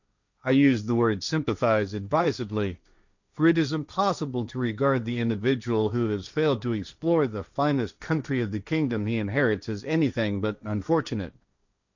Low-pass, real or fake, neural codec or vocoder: 7.2 kHz; fake; codec, 16 kHz, 1.1 kbps, Voila-Tokenizer